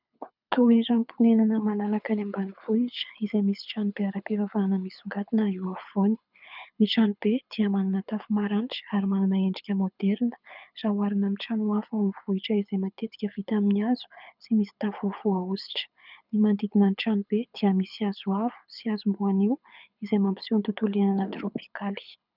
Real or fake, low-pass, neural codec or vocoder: fake; 5.4 kHz; codec, 24 kHz, 6 kbps, HILCodec